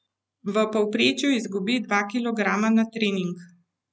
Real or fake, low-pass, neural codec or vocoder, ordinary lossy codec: real; none; none; none